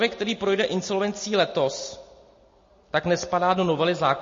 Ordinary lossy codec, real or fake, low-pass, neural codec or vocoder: MP3, 32 kbps; real; 7.2 kHz; none